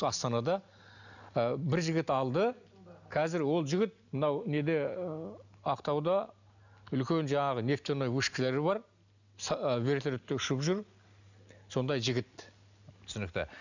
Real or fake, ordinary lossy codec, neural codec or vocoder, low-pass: real; none; none; 7.2 kHz